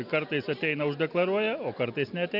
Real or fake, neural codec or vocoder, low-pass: real; none; 5.4 kHz